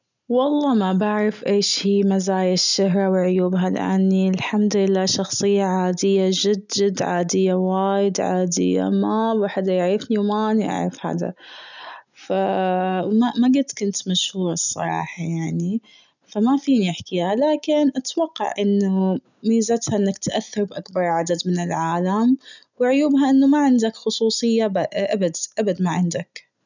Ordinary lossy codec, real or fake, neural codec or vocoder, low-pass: none; real; none; 7.2 kHz